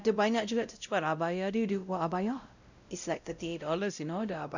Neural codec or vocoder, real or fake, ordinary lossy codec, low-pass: codec, 16 kHz, 0.5 kbps, X-Codec, WavLM features, trained on Multilingual LibriSpeech; fake; none; 7.2 kHz